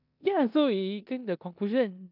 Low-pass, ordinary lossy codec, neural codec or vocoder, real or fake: 5.4 kHz; none; codec, 16 kHz in and 24 kHz out, 0.9 kbps, LongCat-Audio-Codec, four codebook decoder; fake